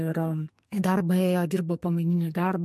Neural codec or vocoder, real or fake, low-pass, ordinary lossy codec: codec, 44.1 kHz, 2.6 kbps, SNAC; fake; 14.4 kHz; MP3, 64 kbps